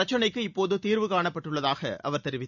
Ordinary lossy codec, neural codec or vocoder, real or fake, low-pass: none; none; real; 7.2 kHz